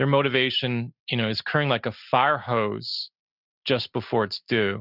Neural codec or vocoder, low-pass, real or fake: none; 5.4 kHz; real